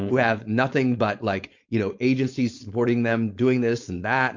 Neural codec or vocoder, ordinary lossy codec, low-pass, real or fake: codec, 16 kHz, 4.8 kbps, FACodec; MP3, 48 kbps; 7.2 kHz; fake